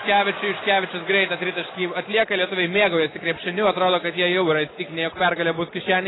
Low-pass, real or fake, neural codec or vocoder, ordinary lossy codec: 7.2 kHz; real; none; AAC, 16 kbps